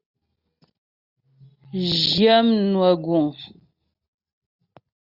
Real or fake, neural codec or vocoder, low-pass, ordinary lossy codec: real; none; 5.4 kHz; Opus, 64 kbps